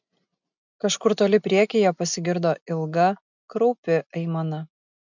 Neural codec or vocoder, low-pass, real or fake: none; 7.2 kHz; real